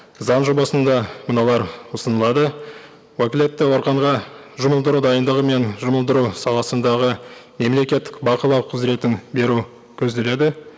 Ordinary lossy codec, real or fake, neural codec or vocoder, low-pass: none; real; none; none